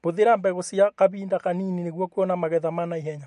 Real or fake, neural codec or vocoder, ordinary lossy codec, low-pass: real; none; MP3, 48 kbps; 14.4 kHz